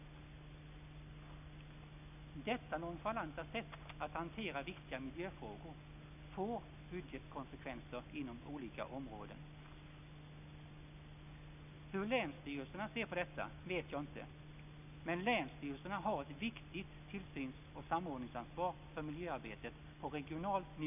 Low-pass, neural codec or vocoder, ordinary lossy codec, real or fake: 3.6 kHz; none; none; real